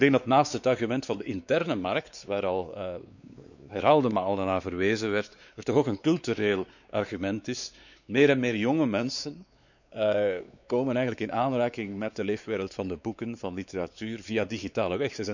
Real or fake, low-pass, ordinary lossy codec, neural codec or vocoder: fake; 7.2 kHz; none; codec, 16 kHz, 4 kbps, X-Codec, WavLM features, trained on Multilingual LibriSpeech